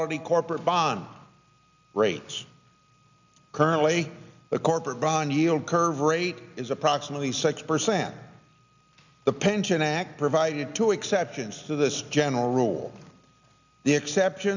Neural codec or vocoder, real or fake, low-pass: none; real; 7.2 kHz